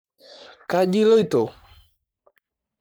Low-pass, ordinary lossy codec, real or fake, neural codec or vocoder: none; none; fake; codec, 44.1 kHz, 3.4 kbps, Pupu-Codec